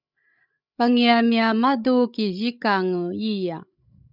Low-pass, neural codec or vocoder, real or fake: 5.4 kHz; codec, 16 kHz, 16 kbps, FreqCodec, larger model; fake